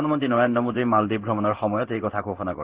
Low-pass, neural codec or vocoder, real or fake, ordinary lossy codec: 3.6 kHz; codec, 16 kHz in and 24 kHz out, 1 kbps, XY-Tokenizer; fake; Opus, 16 kbps